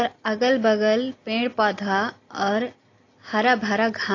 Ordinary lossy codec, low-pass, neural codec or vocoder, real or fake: AAC, 32 kbps; 7.2 kHz; none; real